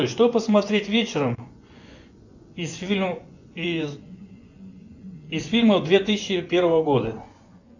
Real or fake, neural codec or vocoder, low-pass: fake; vocoder, 24 kHz, 100 mel bands, Vocos; 7.2 kHz